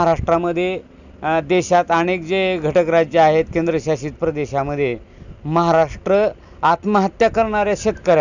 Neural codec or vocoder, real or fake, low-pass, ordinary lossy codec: none; real; 7.2 kHz; none